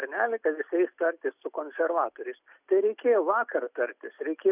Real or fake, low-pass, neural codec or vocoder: real; 3.6 kHz; none